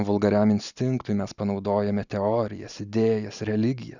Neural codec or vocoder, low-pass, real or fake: none; 7.2 kHz; real